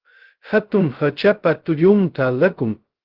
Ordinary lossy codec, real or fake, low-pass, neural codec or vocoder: Opus, 16 kbps; fake; 5.4 kHz; codec, 16 kHz, 0.2 kbps, FocalCodec